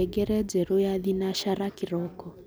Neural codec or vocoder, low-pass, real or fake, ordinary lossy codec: none; none; real; none